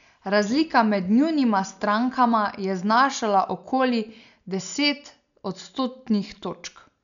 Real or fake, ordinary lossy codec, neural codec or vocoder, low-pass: real; none; none; 7.2 kHz